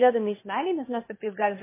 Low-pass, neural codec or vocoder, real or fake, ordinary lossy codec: 3.6 kHz; codec, 16 kHz, 0.8 kbps, ZipCodec; fake; MP3, 16 kbps